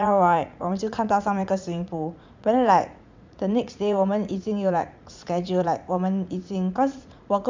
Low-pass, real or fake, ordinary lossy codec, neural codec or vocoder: 7.2 kHz; fake; MP3, 64 kbps; vocoder, 44.1 kHz, 80 mel bands, Vocos